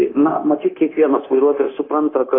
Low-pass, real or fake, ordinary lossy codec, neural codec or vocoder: 5.4 kHz; fake; AAC, 24 kbps; codec, 16 kHz, 0.9 kbps, LongCat-Audio-Codec